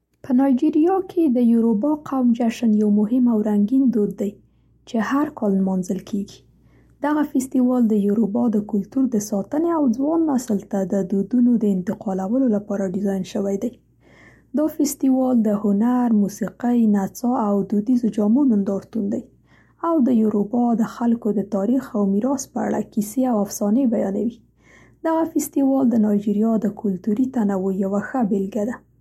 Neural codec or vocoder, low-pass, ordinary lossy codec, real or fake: none; 19.8 kHz; MP3, 64 kbps; real